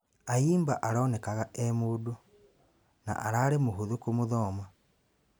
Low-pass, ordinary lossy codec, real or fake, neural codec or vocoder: none; none; real; none